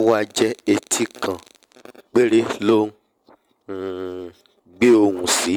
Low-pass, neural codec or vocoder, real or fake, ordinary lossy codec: 19.8 kHz; vocoder, 44.1 kHz, 128 mel bands every 512 samples, BigVGAN v2; fake; none